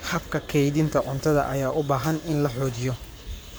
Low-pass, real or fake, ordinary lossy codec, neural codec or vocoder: none; fake; none; codec, 44.1 kHz, 7.8 kbps, Pupu-Codec